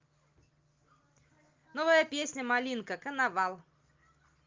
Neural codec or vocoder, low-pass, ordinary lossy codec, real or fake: none; 7.2 kHz; Opus, 32 kbps; real